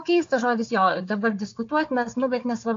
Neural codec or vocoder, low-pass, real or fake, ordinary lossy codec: codec, 16 kHz, 4 kbps, FunCodec, trained on Chinese and English, 50 frames a second; 7.2 kHz; fake; AAC, 48 kbps